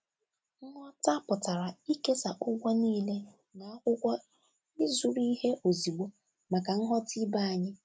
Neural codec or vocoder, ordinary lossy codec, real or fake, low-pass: none; none; real; none